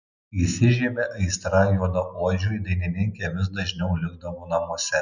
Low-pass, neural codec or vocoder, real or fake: 7.2 kHz; none; real